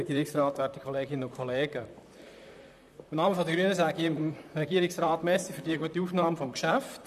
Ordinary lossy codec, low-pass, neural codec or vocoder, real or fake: none; 14.4 kHz; vocoder, 44.1 kHz, 128 mel bands, Pupu-Vocoder; fake